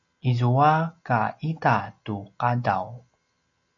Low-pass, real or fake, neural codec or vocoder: 7.2 kHz; real; none